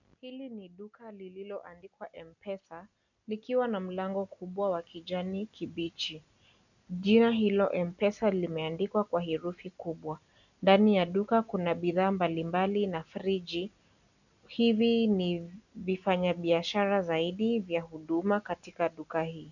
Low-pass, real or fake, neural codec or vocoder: 7.2 kHz; real; none